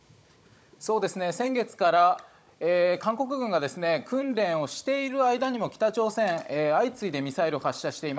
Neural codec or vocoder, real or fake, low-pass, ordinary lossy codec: codec, 16 kHz, 16 kbps, FunCodec, trained on Chinese and English, 50 frames a second; fake; none; none